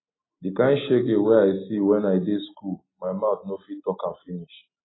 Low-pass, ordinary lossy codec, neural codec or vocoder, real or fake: 7.2 kHz; AAC, 16 kbps; none; real